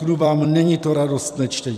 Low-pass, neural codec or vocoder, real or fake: 14.4 kHz; vocoder, 44.1 kHz, 128 mel bands every 256 samples, BigVGAN v2; fake